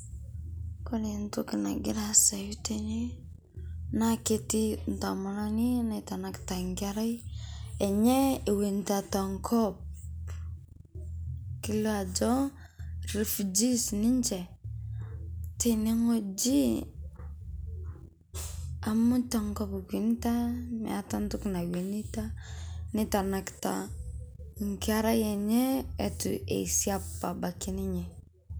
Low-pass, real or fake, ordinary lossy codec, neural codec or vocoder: none; real; none; none